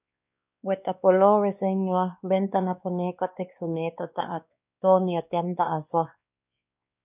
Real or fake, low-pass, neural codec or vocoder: fake; 3.6 kHz; codec, 16 kHz, 2 kbps, X-Codec, WavLM features, trained on Multilingual LibriSpeech